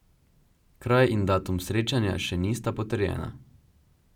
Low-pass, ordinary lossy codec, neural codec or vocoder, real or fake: 19.8 kHz; none; none; real